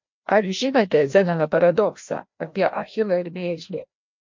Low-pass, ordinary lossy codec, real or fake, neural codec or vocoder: 7.2 kHz; MP3, 48 kbps; fake; codec, 16 kHz, 1 kbps, FreqCodec, larger model